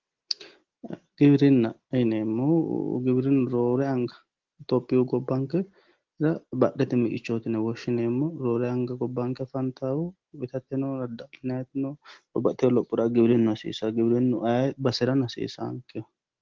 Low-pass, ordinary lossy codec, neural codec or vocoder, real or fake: 7.2 kHz; Opus, 16 kbps; none; real